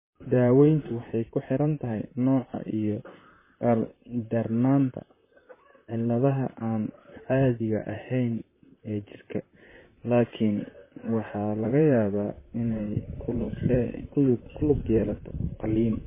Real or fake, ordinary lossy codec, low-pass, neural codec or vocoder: fake; MP3, 16 kbps; 3.6 kHz; vocoder, 44.1 kHz, 128 mel bands, Pupu-Vocoder